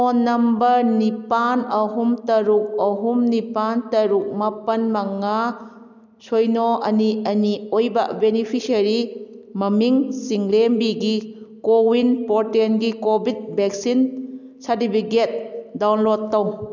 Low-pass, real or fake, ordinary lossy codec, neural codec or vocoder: 7.2 kHz; real; none; none